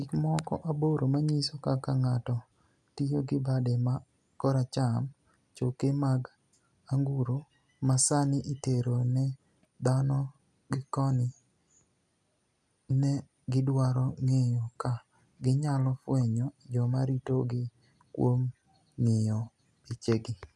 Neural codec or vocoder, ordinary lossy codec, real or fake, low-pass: none; none; real; none